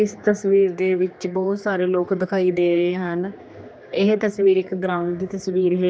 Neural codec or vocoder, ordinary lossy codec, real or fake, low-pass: codec, 16 kHz, 2 kbps, X-Codec, HuBERT features, trained on general audio; none; fake; none